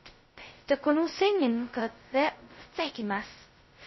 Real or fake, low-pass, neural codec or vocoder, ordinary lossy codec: fake; 7.2 kHz; codec, 16 kHz, 0.2 kbps, FocalCodec; MP3, 24 kbps